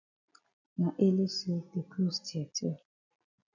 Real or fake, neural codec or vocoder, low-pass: real; none; 7.2 kHz